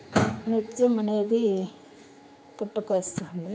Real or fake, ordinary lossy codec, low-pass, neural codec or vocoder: fake; none; none; codec, 16 kHz, 4 kbps, X-Codec, HuBERT features, trained on general audio